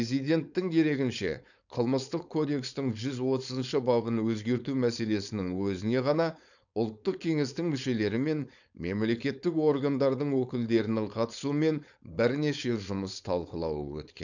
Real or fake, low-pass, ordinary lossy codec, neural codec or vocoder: fake; 7.2 kHz; none; codec, 16 kHz, 4.8 kbps, FACodec